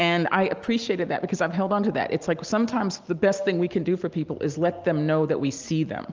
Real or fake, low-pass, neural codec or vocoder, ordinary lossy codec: real; 7.2 kHz; none; Opus, 24 kbps